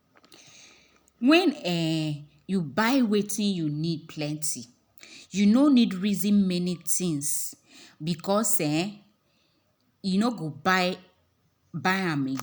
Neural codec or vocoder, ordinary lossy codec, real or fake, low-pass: none; none; real; none